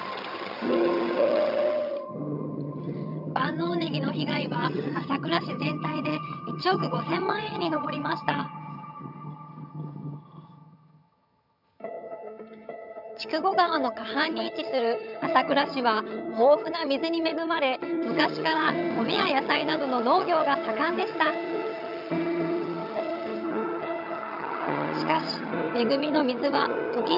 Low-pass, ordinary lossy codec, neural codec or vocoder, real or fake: 5.4 kHz; none; vocoder, 22.05 kHz, 80 mel bands, HiFi-GAN; fake